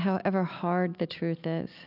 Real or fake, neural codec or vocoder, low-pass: real; none; 5.4 kHz